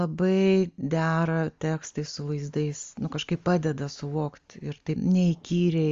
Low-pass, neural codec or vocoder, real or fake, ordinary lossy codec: 7.2 kHz; none; real; Opus, 32 kbps